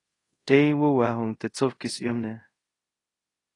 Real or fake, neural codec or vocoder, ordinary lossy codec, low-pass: fake; codec, 24 kHz, 0.5 kbps, DualCodec; AAC, 32 kbps; 10.8 kHz